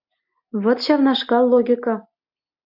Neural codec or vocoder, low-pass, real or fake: none; 5.4 kHz; real